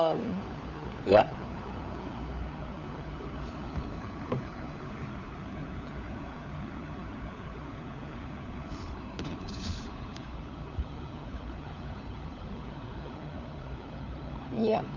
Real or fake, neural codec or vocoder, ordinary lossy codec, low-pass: fake; codec, 16 kHz, 4 kbps, FunCodec, trained on LibriTTS, 50 frames a second; none; 7.2 kHz